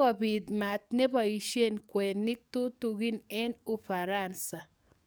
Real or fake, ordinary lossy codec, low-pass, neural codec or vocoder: fake; none; none; codec, 44.1 kHz, 7.8 kbps, DAC